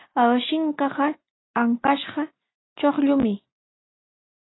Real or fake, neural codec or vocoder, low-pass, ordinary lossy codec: real; none; 7.2 kHz; AAC, 16 kbps